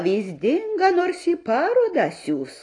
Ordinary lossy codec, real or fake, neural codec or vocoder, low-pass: AAC, 32 kbps; real; none; 10.8 kHz